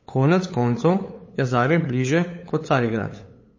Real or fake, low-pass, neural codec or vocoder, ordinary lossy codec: fake; 7.2 kHz; codec, 16 kHz, 8 kbps, FunCodec, trained on LibriTTS, 25 frames a second; MP3, 32 kbps